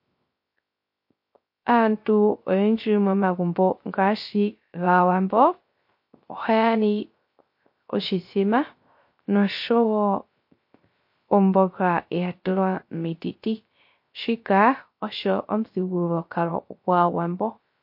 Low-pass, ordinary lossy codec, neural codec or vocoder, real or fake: 5.4 kHz; MP3, 32 kbps; codec, 16 kHz, 0.3 kbps, FocalCodec; fake